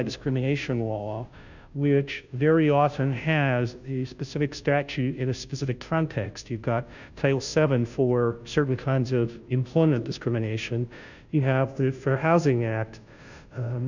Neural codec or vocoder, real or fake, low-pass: codec, 16 kHz, 0.5 kbps, FunCodec, trained on Chinese and English, 25 frames a second; fake; 7.2 kHz